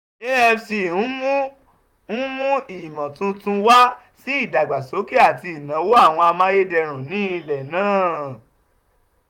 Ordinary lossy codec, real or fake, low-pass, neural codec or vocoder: none; fake; 19.8 kHz; vocoder, 44.1 kHz, 128 mel bands, Pupu-Vocoder